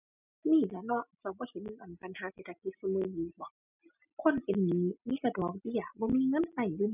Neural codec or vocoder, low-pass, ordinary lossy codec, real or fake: none; 3.6 kHz; none; real